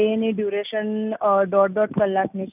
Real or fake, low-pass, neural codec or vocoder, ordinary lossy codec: real; 3.6 kHz; none; none